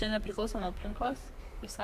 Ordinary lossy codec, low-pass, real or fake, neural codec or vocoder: Opus, 64 kbps; 14.4 kHz; fake; codec, 32 kHz, 1.9 kbps, SNAC